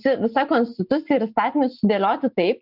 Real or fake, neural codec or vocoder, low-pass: real; none; 5.4 kHz